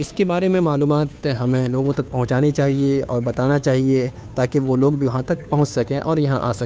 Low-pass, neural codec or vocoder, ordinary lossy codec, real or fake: none; codec, 16 kHz, 2 kbps, FunCodec, trained on Chinese and English, 25 frames a second; none; fake